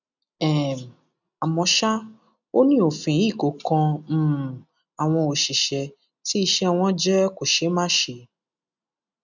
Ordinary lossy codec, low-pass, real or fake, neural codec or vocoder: none; 7.2 kHz; real; none